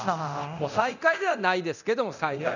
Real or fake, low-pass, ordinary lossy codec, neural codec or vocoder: fake; 7.2 kHz; none; codec, 24 kHz, 0.9 kbps, DualCodec